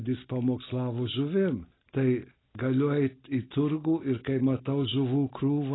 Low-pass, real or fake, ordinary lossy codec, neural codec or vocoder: 7.2 kHz; real; AAC, 16 kbps; none